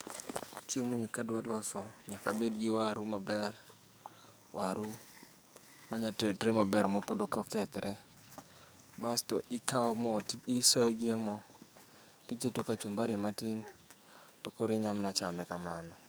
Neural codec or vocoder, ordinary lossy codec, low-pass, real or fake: codec, 44.1 kHz, 2.6 kbps, SNAC; none; none; fake